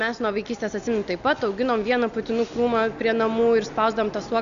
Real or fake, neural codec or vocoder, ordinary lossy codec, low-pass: real; none; AAC, 96 kbps; 7.2 kHz